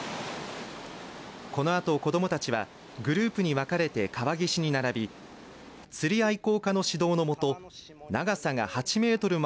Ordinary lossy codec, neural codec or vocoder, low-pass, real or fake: none; none; none; real